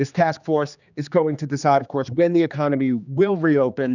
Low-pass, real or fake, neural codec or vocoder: 7.2 kHz; fake; codec, 16 kHz, 2 kbps, X-Codec, HuBERT features, trained on general audio